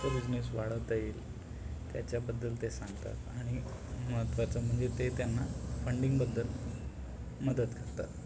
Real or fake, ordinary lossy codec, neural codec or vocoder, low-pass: real; none; none; none